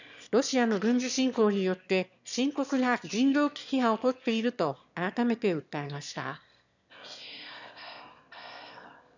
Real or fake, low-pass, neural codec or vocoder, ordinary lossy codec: fake; 7.2 kHz; autoencoder, 22.05 kHz, a latent of 192 numbers a frame, VITS, trained on one speaker; none